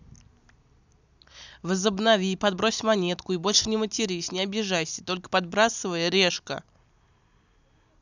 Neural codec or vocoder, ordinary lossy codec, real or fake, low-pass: none; none; real; 7.2 kHz